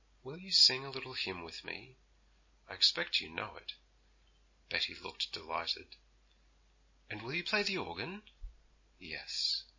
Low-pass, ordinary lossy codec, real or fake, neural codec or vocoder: 7.2 kHz; MP3, 32 kbps; real; none